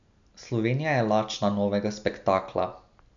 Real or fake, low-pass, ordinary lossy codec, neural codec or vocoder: real; 7.2 kHz; none; none